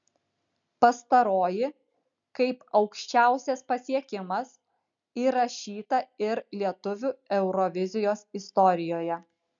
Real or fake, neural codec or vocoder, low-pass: real; none; 7.2 kHz